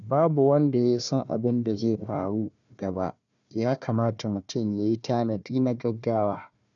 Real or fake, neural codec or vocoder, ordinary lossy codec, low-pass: fake; codec, 16 kHz, 1 kbps, FunCodec, trained on Chinese and English, 50 frames a second; none; 7.2 kHz